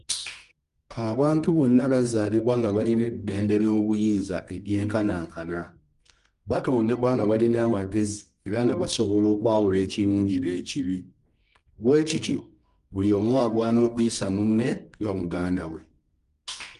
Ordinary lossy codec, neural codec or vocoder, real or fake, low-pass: Opus, 32 kbps; codec, 24 kHz, 0.9 kbps, WavTokenizer, medium music audio release; fake; 10.8 kHz